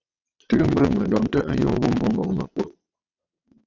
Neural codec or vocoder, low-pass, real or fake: vocoder, 22.05 kHz, 80 mel bands, WaveNeXt; 7.2 kHz; fake